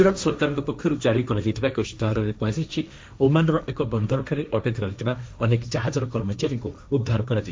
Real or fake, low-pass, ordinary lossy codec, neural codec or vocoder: fake; none; none; codec, 16 kHz, 1.1 kbps, Voila-Tokenizer